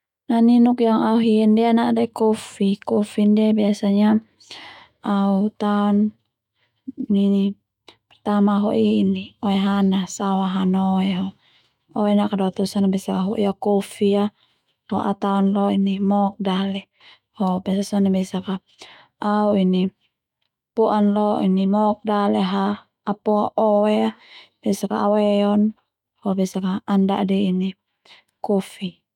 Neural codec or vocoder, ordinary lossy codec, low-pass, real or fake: autoencoder, 48 kHz, 128 numbers a frame, DAC-VAE, trained on Japanese speech; none; 19.8 kHz; fake